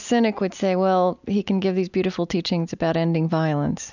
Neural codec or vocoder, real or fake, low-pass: none; real; 7.2 kHz